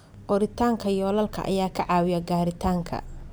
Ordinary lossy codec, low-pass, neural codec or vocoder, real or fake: none; none; none; real